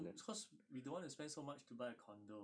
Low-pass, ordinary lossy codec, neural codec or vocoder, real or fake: 9.9 kHz; none; none; real